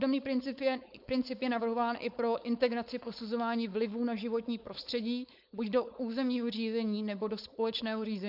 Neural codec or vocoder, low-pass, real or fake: codec, 16 kHz, 4.8 kbps, FACodec; 5.4 kHz; fake